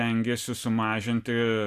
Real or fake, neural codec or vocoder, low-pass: real; none; 14.4 kHz